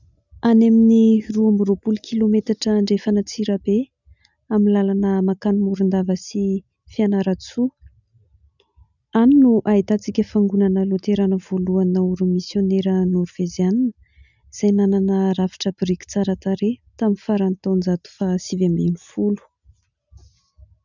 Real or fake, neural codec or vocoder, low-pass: real; none; 7.2 kHz